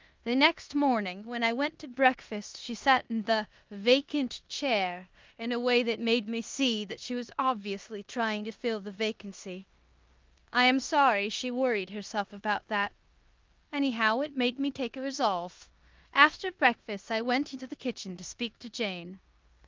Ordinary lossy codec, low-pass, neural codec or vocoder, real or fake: Opus, 32 kbps; 7.2 kHz; codec, 16 kHz in and 24 kHz out, 0.9 kbps, LongCat-Audio-Codec, four codebook decoder; fake